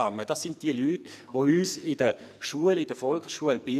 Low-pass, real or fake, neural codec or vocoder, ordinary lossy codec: 14.4 kHz; fake; codec, 44.1 kHz, 2.6 kbps, SNAC; none